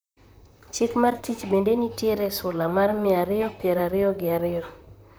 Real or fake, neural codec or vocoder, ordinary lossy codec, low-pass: fake; vocoder, 44.1 kHz, 128 mel bands, Pupu-Vocoder; none; none